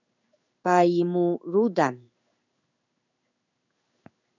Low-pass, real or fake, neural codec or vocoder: 7.2 kHz; fake; codec, 16 kHz in and 24 kHz out, 1 kbps, XY-Tokenizer